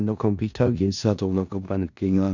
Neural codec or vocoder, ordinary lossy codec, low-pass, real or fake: codec, 16 kHz in and 24 kHz out, 0.4 kbps, LongCat-Audio-Codec, four codebook decoder; none; 7.2 kHz; fake